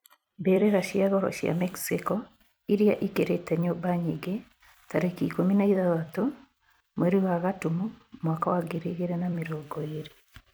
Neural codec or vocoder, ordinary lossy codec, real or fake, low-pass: vocoder, 44.1 kHz, 128 mel bands every 256 samples, BigVGAN v2; none; fake; none